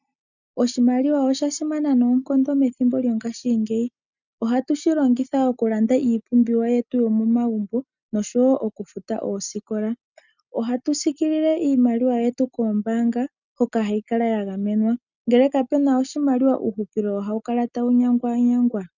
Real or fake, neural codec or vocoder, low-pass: real; none; 7.2 kHz